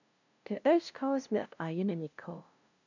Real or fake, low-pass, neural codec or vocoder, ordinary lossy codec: fake; 7.2 kHz; codec, 16 kHz, 0.5 kbps, FunCodec, trained on LibriTTS, 25 frames a second; none